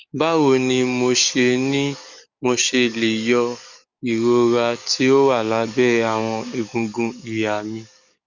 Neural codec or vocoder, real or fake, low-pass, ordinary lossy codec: codec, 44.1 kHz, 7.8 kbps, DAC; fake; 7.2 kHz; Opus, 64 kbps